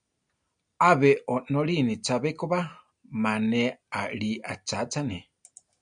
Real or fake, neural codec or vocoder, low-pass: real; none; 9.9 kHz